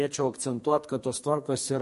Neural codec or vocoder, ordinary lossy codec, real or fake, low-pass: codec, 44.1 kHz, 2.6 kbps, SNAC; MP3, 48 kbps; fake; 14.4 kHz